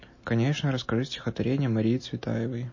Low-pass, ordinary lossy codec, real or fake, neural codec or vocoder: 7.2 kHz; MP3, 32 kbps; real; none